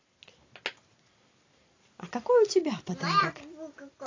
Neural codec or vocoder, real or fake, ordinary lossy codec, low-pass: none; real; AAC, 32 kbps; 7.2 kHz